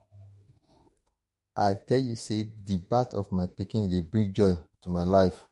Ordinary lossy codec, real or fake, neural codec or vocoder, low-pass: MP3, 48 kbps; fake; autoencoder, 48 kHz, 32 numbers a frame, DAC-VAE, trained on Japanese speech; 14.4 kHz